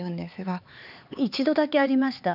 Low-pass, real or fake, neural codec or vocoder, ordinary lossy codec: 5.4 kHz; fake; codec, 16 kHz, 4 kbps, X-Codec, HuBERT features, trained on LibriSpeech; none